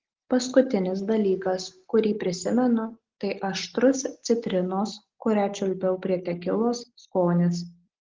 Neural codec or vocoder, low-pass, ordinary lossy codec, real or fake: none; 7.2 kHz; Opus, 16 kbps; real